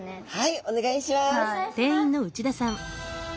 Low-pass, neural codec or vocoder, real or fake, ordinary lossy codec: none; none; real; none